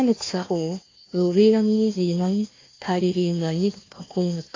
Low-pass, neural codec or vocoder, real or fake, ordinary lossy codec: 7.2 kHz; codec, 16 kHz, 1 kbps, FunCodec, trained on Chinese and English, 50 frames a second; fake; AAC, 32 kbps